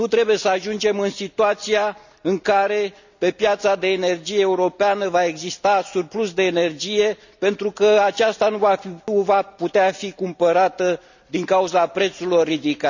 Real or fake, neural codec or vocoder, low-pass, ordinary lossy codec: real; none; 7.2 kHz; none